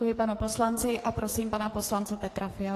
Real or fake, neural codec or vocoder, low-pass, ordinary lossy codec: fake; codec, 44.1 kHz, 2.6 kbps, SNAC; 14.4 kHz; AAC, 48 kbps